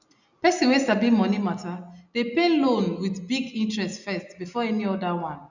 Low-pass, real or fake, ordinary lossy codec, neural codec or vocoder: 7.2 kHz; real; none; none